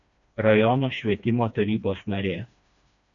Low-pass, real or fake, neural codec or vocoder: 7.2 kHz; fake; codec, 16 kHz, 2 kbps, FreqCodec, smaller model